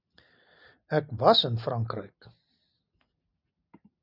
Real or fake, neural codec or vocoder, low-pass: real; none; 5.4 kHz